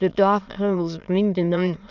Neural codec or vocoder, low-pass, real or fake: autoencoder, 22.05 kHz, a latent of 192 numbers a frame, VITS, trained on many speakers; 7.2 kHz; fake